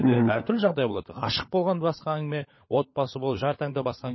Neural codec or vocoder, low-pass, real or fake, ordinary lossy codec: codec, 16 kHz, 16 kbps, FunCodec, trained on LibriTTS, 50 frames a second; 7.2 kHz; fake; MP3, 24 kbps